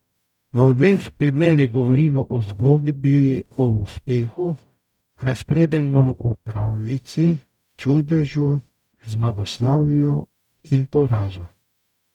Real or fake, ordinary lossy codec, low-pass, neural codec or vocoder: fake; none; 19.8 kHz; codec, 44.1 kHz, 0.9 kbps, DAC